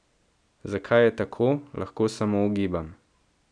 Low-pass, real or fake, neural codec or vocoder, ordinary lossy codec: 9.9 kHz; real; none; none